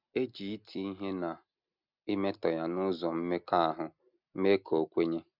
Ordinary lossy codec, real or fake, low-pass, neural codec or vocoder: none; real; 5.4 kHz; none